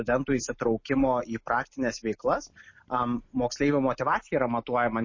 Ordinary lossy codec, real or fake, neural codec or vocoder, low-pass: MP3, 32 kbps; real; none; 7.2 kHz